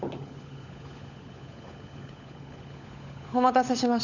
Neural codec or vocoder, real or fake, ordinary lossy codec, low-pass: codec, 16 kHz, 16 kbps, FunCodec, trained on LibriTTS, 50 frames a second; fake; none; 7.2 kHz